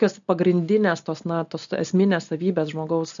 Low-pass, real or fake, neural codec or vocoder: 7.2 kHz; real; none